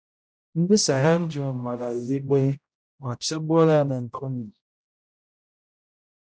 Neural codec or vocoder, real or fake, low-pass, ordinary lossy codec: codec, 16 kHz, 0.5 kbps, X-Codec, HuBERT features, trained on general audio; fake; none; none